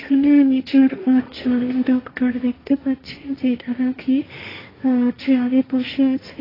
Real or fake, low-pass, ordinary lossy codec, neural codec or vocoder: fake; 5.4 kHz; AAC, 24 kbps; codec, 16 kHz, 1.1 kbps, Voila-Tokenizer